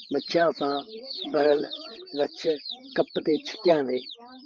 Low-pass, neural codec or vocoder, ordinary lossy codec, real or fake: 7.2 kHz; codec, 16 kHz, 8 kbps, FreqCodec, larger model; Opus, 32 kbps; fake